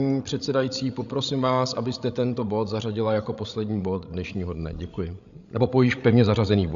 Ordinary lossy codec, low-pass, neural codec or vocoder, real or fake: AAC, 96 kbps; 7.2 kHz; codec, 16 kHz, 16 kbps, FreqCodec, larger model; fake